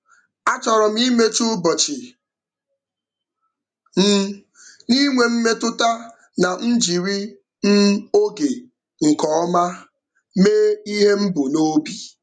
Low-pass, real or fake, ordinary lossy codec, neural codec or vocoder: 9.9 kHz; real; none; none